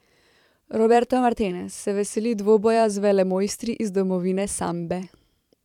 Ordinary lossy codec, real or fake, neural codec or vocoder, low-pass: none; fake; vocoder, 44.1 kHz, 128 mel bands every 512 samples, BigVGAN v2; 19.8 kHz